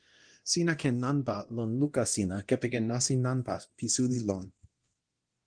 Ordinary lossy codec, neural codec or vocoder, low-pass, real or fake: Opus, 16 kbps; codec, 24 kHz, 0.9 kbps, DualCodec; 9.9 kHz; fake